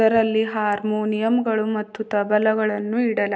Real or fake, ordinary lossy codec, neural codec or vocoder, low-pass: real; none; none; none